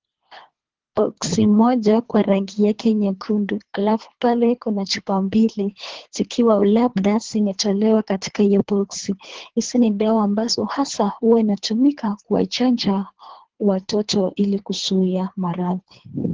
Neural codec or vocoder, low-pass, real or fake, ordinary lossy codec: codec, 24 kHz, 3 kbps, HILCodec; 7.2 kHz; fake; Opus, 16 kbps